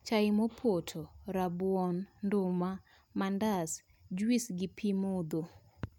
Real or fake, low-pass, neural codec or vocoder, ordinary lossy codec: real; 19.8 kHz; none; none